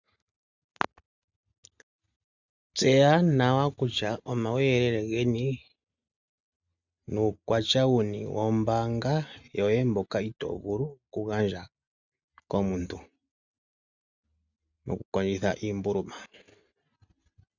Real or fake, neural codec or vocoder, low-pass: real; none; 7.2 kHz